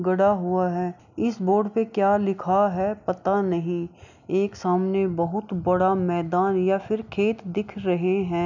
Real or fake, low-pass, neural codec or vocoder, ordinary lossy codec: real; 7.2 kHz; none; none